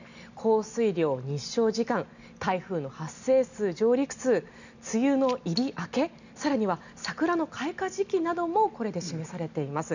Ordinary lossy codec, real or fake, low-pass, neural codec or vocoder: none; real; 7.2 kHz; none